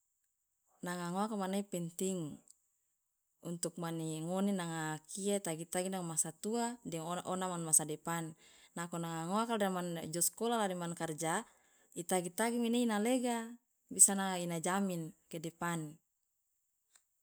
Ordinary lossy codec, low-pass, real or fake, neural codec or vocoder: none; none; real; none